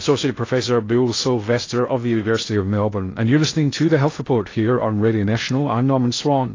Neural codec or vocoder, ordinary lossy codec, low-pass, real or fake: codec, 16 kHz in and 24 kHz out, 0.6 kbps, FocalCodec, streaming, 2048 codes; AAC, 32 kbps; 7.2 kHz; fake